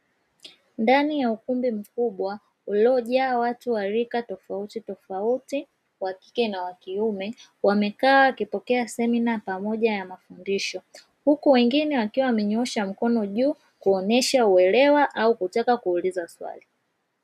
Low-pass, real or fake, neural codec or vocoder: 14.4 kHz; real; none